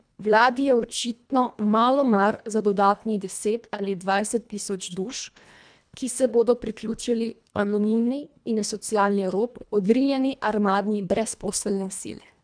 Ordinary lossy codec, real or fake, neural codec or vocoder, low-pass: none; fake; codec, 24 kHz, 1.5 kbps, HILCodec; 9.9 kHz